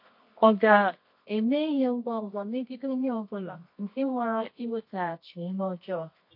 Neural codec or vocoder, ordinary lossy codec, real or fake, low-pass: codec, 24 kHz, 0.9 kbps, WavTokenizer, medium music audio release; MP3, 32 kbps; fake; 5.4 kHz